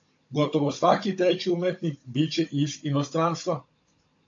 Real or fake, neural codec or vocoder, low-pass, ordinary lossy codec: fake; codec, 16 kHz, 16 kbps, FunCodec, trained on Chinese and English, 50 frames a second; 7.2 kHz; AAC, 48 kbps